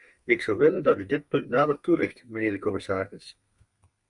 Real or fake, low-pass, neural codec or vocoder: fake; 10.8 kHz; codec, 32 kHz, 1.9 kbps, SNAC